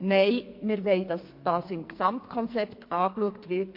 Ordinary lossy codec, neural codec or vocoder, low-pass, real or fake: MP3, 32 kbps; codec, 44.1 kHz, 2.6 kbps, SNAC; 5.4 kHz; fake